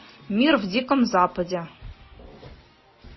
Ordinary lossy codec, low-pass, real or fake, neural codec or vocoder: MP3, 24 kbps; 7.2 kHz; real; none